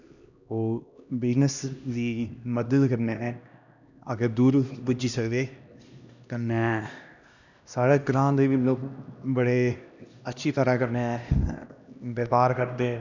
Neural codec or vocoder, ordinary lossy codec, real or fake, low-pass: codec, 16 kHz, 1 kbps, X-Codec, HuBERT features, trained on LibriSpeech; none; fake; 7.2 kHz